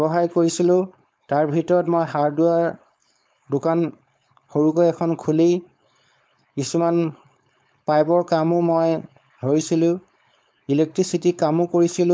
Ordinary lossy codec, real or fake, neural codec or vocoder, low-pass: none; fake; codec, 16 kHz, 4.8 kbps, FACodec; none